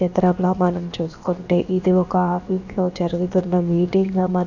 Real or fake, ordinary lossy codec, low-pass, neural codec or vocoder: fake; none; 7.2 kHz; codec, 16 kHz, about 1 kbps, DyCAST, with the encoder's durations